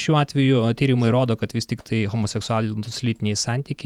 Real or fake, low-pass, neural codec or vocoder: fake; 19.8 kHz; vocoder, 48 kHz, 128 mel bands, Vocos